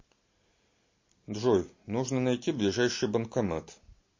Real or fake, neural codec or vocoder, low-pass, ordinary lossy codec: real; none; 7.2 kHz; MP3, 32 kbps